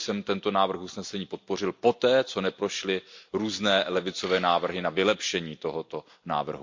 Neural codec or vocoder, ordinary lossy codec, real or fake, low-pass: none; MP3, 48 kbps; real; 7.2 kHz